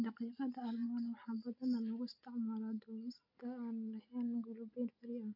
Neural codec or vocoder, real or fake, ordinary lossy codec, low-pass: none; real; none; 5.4 kHz